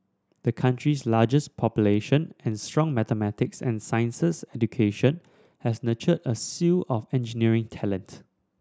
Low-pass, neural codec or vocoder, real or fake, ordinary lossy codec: none; none; real; none